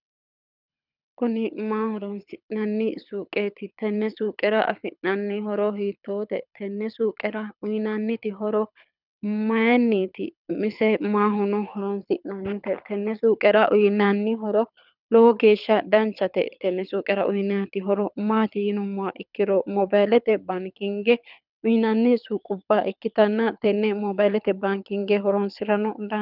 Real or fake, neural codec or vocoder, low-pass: fake; codec, 24 kHz, 6 kbps, HILCodec; 5.4 kHz